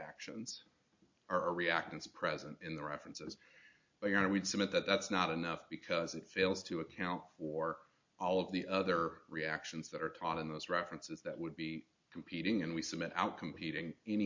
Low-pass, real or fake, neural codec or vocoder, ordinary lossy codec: 7.2 kHz; real; none; MP3, 48 kbps